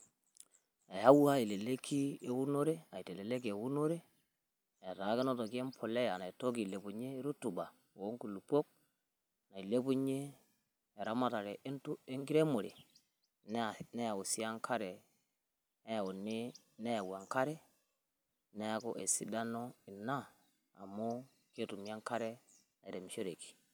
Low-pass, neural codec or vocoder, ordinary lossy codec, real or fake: none; none; none; real